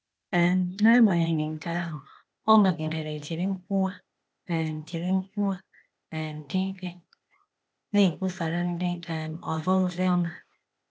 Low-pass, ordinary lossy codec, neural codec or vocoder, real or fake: none; none; codec, 16 kHz, 0.8 kbps, ZipCodec; fake